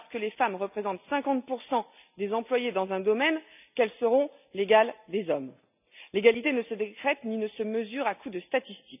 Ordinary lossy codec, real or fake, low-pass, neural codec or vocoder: none; real; 3.6 kHz; none